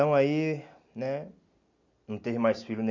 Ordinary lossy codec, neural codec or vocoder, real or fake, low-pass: none; none; real; 7.2 kHz